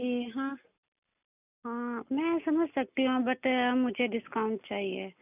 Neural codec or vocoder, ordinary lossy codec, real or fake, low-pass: none; none; real; 3.6 kHz